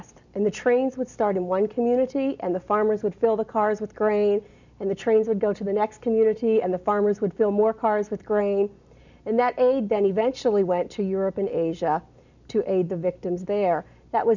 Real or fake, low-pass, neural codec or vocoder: real; 7.2 kHz; none